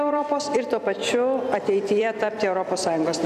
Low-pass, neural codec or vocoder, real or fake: 14.4 kHz; vocoder, 44.1 kHz, 128 mel bands every 256 samples, BigVGAN v2; fake